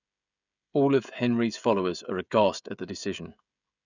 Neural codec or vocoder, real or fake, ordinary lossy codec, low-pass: codec, 16 kHz, 16 kbps, FreqCodec, smaller model; fake; none; 7.2 kHz